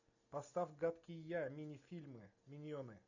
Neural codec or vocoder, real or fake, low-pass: none; real; 7.2 kHz